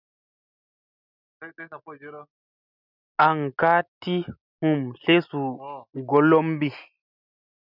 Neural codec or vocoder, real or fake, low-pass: none; real; 5.4 kHz